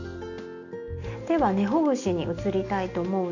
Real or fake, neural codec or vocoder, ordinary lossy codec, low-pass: real; none; none; 7.2 kHz